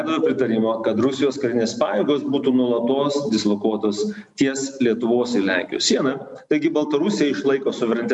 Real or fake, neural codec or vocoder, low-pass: real; none; 10.8 kHz